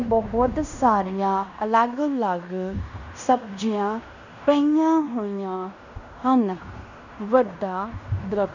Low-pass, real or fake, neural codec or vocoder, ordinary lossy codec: 7.2 kHz; fake; codec, 16 kHz in and 24 kHz out, 0.9 kbps, LongCat-Audio-Codec, fine tuned four codebook decoder; none